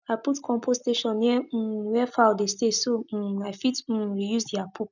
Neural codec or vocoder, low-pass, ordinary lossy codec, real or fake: none; 7.2 kHz; none; real